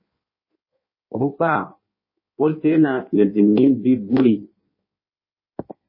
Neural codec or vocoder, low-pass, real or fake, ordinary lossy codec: codec, 16 kHz in and 24 kHz out, 1.1 kbps, FireRedTTS-2 codec; 5.4 kHz; fake; MP3, 24 kbps